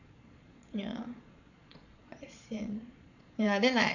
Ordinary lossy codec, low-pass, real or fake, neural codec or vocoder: none; 7.2 kHz; fake; vocoder, 22.05 kHz, 80 mel bands, Vocos